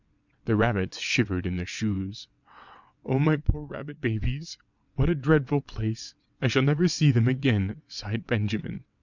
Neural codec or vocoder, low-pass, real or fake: vocoder, 22.05 kHz, 80 mel bands, WaveNeXt; 7.2 kHz; fake